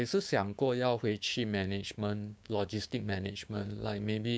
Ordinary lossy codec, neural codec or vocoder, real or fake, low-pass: none; codec, 16 kHz, 6 kbps, DAC; fake; none